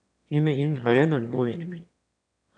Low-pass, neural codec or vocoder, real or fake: 9.9 kHz; autoencoder, 22.05 kHz, a latent of 192 numbers a frame, VITS, trained on one speaker; fake